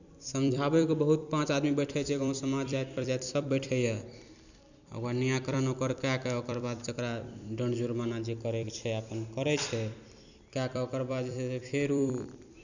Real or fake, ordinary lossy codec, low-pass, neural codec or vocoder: fake; none; 7.2 kHz; vocoder, 44.1 kHz, 128 mel bands every 256 samples, BigVGAN v2